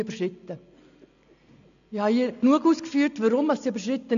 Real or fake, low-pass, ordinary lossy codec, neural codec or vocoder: real; 7.2 kHz; none; none